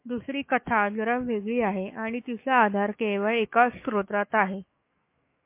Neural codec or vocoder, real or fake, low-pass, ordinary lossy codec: autoencoder, 48 kHz, 128 numbers a frame, DAC-VAE, trained on Japanese speech; fake; 3.6 kHz; MP3, 24 kbps